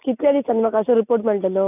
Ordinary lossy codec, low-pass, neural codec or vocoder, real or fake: none; 3.6 kHz; none; real